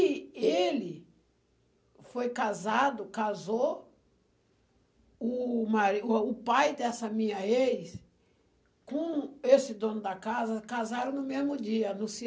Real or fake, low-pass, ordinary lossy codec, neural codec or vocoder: real; none; none; none